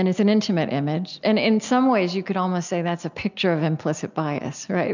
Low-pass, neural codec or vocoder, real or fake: 7.2 kHz; none; real